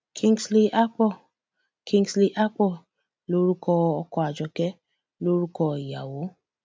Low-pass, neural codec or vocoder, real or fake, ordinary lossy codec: none; none; real; none